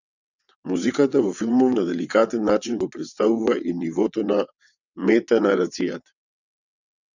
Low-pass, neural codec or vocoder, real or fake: 7.2 kHz; vocoder, 22.05 kHz, 80 mel bands, WaveNeXt; fake